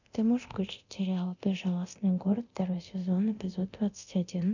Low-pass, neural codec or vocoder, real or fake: 7.2 kHz; codec, 24 kHz, 0.9 kbps, DualCodec; fake